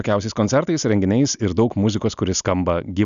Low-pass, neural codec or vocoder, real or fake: 7.2 kHz; none; real